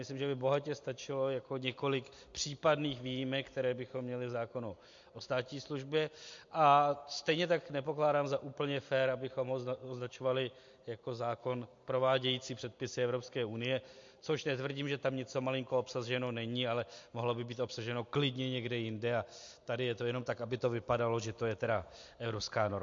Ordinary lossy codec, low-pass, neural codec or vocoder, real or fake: MP3, 48 kbps; 7.2 kHz; none; real